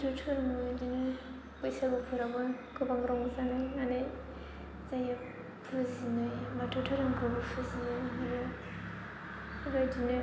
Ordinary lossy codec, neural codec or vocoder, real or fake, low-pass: none; none; real; none